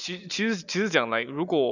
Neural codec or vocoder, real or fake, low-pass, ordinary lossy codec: none; real; 7.2 kHz; none